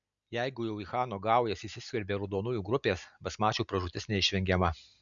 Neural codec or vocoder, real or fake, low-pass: none; real; 7.2 kHz